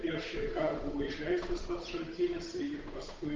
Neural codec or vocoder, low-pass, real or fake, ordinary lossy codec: codec, 16 kHz, 8 kbps, FunCodec, trained on Chinese and English, 25 frames a second; 7.2 kHz; fake; Opus, 32 kbps